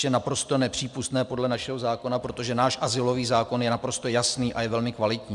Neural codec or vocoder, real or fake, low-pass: none; real; 10.8 kHz